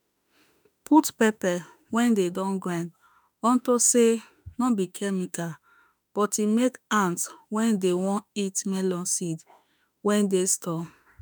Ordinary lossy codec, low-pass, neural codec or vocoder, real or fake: none; none; autoencoder, 48 kHz, 32 numbers a frame, DAC-VAE, trained on Japanese speech; fake